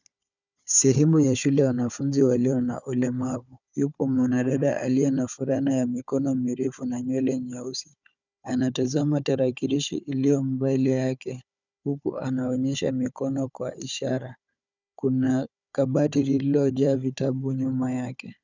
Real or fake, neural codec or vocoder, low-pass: fake; codec, 16 kHz, 4 kbps, FunCodec, trained on Chinese and English, 50 frames a second; 7.2 kHz